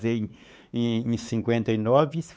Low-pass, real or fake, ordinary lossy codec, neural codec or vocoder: none; fake; none; codec, 16 kHz, 4 kbps, X-Codec, WavLM features, trained on Multilingual LibriSpeech